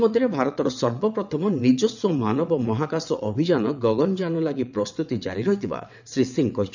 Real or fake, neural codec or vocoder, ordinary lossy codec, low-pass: fake; vocoder, 22.05 kHz, 80 mel bands, WaveNeXt; none; 7.2 kHz